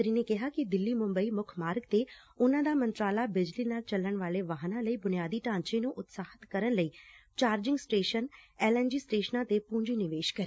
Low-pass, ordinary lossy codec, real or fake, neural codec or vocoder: none; none; real; none